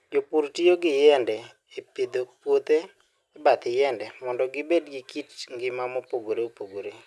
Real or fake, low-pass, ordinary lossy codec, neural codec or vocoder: real; none; none; none